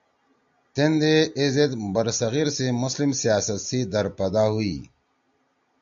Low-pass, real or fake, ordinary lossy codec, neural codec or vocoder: 7.2 kHz; real; AAC, 64 kbps; none